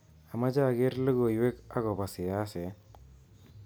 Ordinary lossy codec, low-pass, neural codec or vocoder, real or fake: none; none; none; real